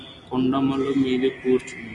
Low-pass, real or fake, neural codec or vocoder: 10.8 kHz; real; none